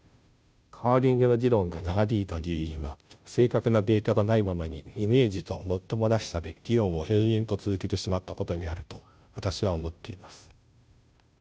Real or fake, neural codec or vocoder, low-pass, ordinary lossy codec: fake; codec, 16 kHz, 0.5 kbps, FunCodec, trained on Chinese and English, 25 frames a second; none; none